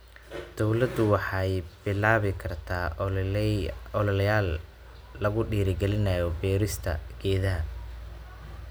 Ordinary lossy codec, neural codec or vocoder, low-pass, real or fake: none; none; none; real